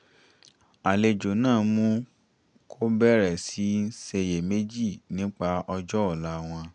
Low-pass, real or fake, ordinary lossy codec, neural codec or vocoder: 9.9 kHz; real; none; none